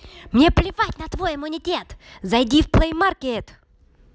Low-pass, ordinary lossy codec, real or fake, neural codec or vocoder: none; none; real; none